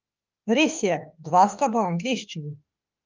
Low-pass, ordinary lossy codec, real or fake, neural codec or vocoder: 7.2 kHz; Opus, 24 kbps; fake; autoencoder, 48 kHz, 32 numbers a frame, DAC-VAE, trained on Japanese speech